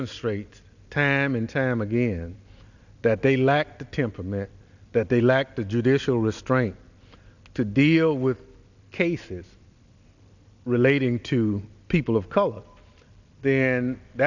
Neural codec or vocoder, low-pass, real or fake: none; 7.2 kHz; real